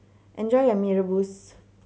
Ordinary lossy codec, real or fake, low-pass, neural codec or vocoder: none; real; none; none